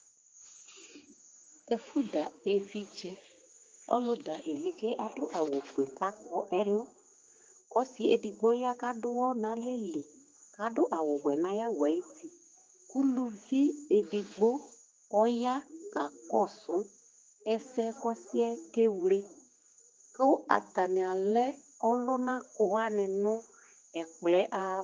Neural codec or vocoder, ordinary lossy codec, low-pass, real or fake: codec, 16 kHz, 4 kbps, X-Codec, HuBERT features, trained on general audio; Opus, 32 kbps; 7.2 kHz; fake